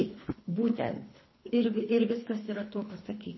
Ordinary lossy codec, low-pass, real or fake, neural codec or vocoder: MP3, 24 kbps; 7.2 kHz; fake; codec, 24 kHz, 3 kbps, HILCodec